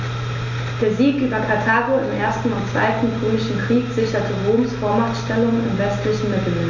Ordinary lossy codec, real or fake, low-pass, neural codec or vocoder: none; real; 7.2 kHz; none